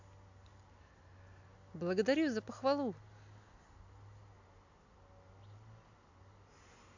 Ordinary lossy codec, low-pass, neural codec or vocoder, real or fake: AAC, 48 kbps; 7.2 kHz; none; real